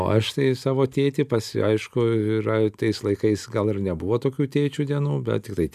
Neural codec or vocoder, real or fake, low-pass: none; real; 14.4 kHz